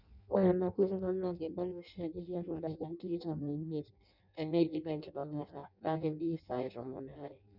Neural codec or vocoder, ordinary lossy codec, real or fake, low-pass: codec, 16 kHz in and 24 kHz out, 0.6 kbps, FireRedTTS-2 codec; none; fake; 5.4 kHz